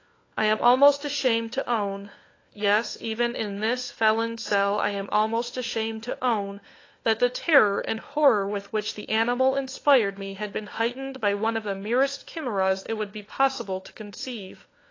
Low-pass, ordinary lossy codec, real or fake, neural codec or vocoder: 7.2 kHz; AAC, 32 kbps; fake; codec, 16 kHz, 4 kbps, FunCodec, trained on LibriTTS, 50 frames a second